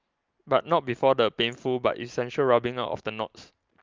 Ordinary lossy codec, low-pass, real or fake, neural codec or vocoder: Opus, 24 kbps; 7.2 kHz; real; none